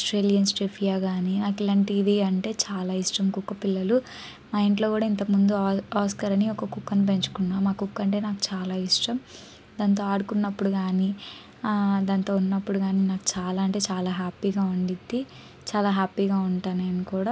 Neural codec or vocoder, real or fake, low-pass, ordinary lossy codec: none; real; none; none